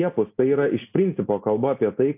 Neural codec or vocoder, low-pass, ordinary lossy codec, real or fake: none; 3.6 kHz; AAC, 32 kbps; real